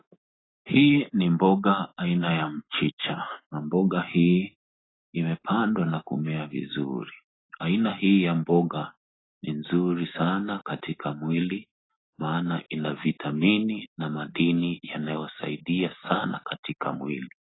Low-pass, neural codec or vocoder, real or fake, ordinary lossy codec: 7.2 kHz; none; real; AAC, 16 kbps